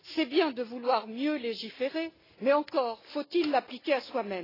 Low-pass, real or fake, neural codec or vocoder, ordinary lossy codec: 5.4 kHz; real; none; AAC, 24 kbps